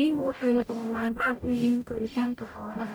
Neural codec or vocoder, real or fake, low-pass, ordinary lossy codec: codec, 44.1 kHz, 0.9 kbps, DAC; fake; none; none